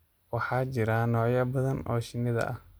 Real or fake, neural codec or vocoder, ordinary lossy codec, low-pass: real; none; none; none